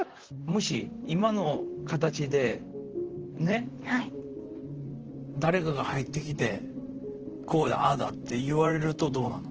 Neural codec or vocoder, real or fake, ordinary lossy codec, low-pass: vocoder, 44.1 kHz, 128 mel bands, Pupu-Vocoder; fake; Opus, 16 kbps; 7.2 kHz